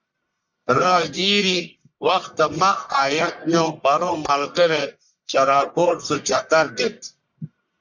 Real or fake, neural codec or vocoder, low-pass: fake; codec, 44.1 kHz, 1.7 kbps, Pupu-Codec; 7.2 kHz